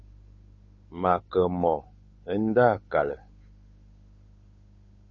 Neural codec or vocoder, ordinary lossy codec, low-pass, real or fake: codec, 16 kHz, 8 kbps, FunCodec, trained on Chinese and English, 25 frames a second; MP3, 32 kbps; 7.2 kHz; fake